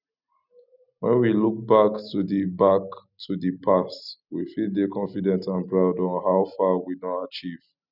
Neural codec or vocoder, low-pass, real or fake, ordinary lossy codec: none; 5.4 kHz; real; none